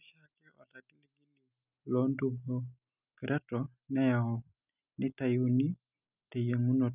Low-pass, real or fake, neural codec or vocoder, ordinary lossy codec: 3.6 kHz; real; none; none